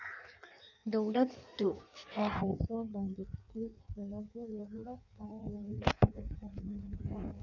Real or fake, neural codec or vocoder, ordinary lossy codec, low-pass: fake; codec, 16 kHz in and 24 kHz out, 1.1 kbps, FireRedTTS-2 codec; none; 7.2 kHz